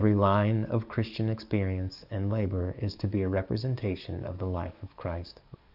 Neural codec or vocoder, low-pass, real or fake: codec, 44.1 kHz, 7.8 kbps, DAC; 5.4 kHz; fake